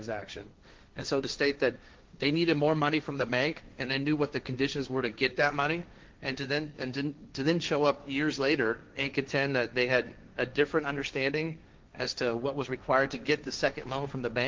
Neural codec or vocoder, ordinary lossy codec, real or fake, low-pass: codec, 16 kHz, 1.1 kbps, Voila-Tokenizer; Opus, 32 kbps; fake; 7.2 kHz